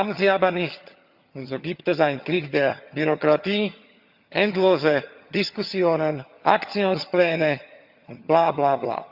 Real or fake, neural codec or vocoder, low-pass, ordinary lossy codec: fake; vocoder, 22.05 kHz, 80 mel bands, HiFi-GAN; 5.4 kHz; Opus, 64 kbps